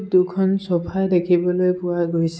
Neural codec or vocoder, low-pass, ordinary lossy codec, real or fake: none; none; none; real